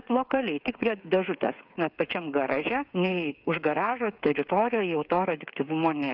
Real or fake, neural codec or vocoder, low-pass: fake; codec, 16 kHz, 8 kbps, FreqCodec, smaller model; 5.4 kHz